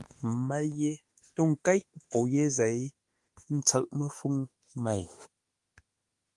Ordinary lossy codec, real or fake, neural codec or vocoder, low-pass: Opus, 24 kbps; fake; autoencoder, 48 kHz, 32 numbers a frame, DAC-VAE, trained on Japanese speech; 10.8 kHz